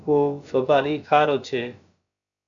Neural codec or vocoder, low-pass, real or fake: codec, 16 kHz, about 1 kbps, DyCAST, with the encoder's durations; 7.2 kHz; fake